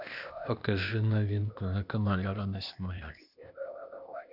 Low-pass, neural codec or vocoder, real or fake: 5.4 kHz; codec, 16 kHz, 0.8 kbps, ZipCodec; fake